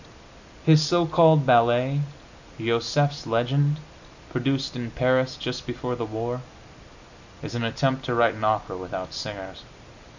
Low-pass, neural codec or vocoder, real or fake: 7.2 kHz; none; real